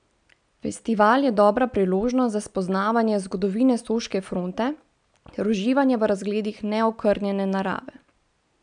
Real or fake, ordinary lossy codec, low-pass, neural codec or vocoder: real; none; 9.9 kHz; none